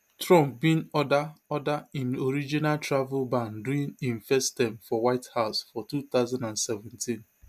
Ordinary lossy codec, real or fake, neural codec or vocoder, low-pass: MP3, 96 kbps; real; none; 14.4 kHz